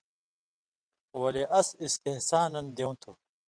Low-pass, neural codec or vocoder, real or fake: 9.9 kHz; vocoder, 22.05 kHz, 80 mel bands, WaveNeXt; fake